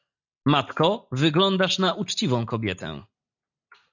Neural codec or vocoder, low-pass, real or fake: none; 7.2 kHz; real